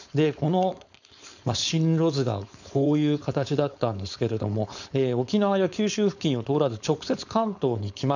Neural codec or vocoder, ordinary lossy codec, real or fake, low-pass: codec, 16 kHz, 4.8 kbps, FACodec; none; fake; 7.2 kHz